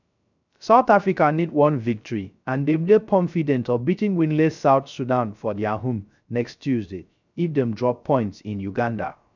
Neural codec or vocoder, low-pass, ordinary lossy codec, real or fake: codec, 16 kHz, 0.3 kbps, FocalCodec; 7.2 kHz; none; fake